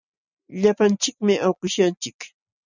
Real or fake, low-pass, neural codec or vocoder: real; 7.2 kHz; none